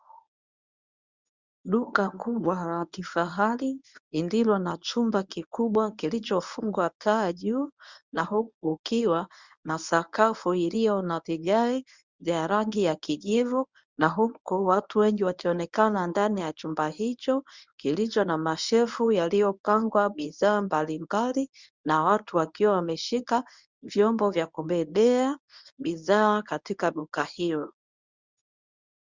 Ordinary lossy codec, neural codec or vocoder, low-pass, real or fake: Opus, 64 kbps; codec, 24 kHz, 0.9 kbps, WavTokenizer, medium speech release version 1; 7.2 kHz; fake